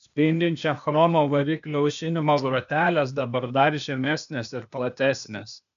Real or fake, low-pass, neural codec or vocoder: fake; 7.2 kHz; codec, 16 kHz, 0.8 kbps, ZipCodec